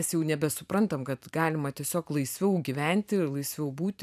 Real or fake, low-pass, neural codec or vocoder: fake; 14.4 kHz; vocoder, 44.1 kHz, 128 mel bands every 512 samples, BigVGAN v2